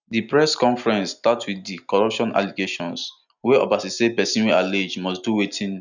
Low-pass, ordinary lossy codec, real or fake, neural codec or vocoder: 7.2 kHz; none; real; none